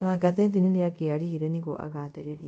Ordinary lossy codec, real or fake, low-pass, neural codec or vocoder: MP3, 48 kbps; fake; 10.8 kHz; codec, 24 kHz, 0.5 kbps, DualCodec